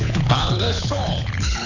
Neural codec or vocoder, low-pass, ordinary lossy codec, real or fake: codec, 24 kHz, 6 kbps, HILCodec; 7.2 kHz; none; fake